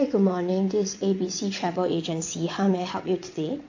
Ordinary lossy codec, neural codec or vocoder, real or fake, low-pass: AAC, 48 kbps; vocoder, 22.05 kHz, 80 mel bands, WaveNeXt; fake; 7.2 kHz